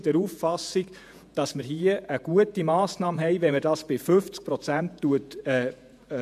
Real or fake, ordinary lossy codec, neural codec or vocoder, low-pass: fake; MP3, 96 kbps; vocoder, 48 kHz, 128 mel bands, Vocos; 14.4 kHz